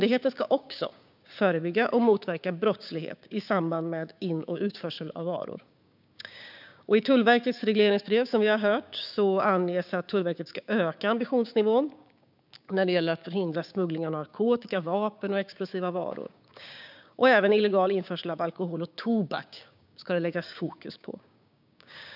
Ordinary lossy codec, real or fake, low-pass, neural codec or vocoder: none; fake; 5.4 kHz; codec, 16 kHz, 6 kbps, DAC